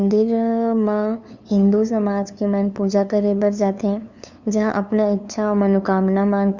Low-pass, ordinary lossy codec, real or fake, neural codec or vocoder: 7.2 kHz; Opus, 64 kbps; fake; codec, 16 kHz, 2 kbps, FunCodec, trained on LibriTTS, 25 frames a second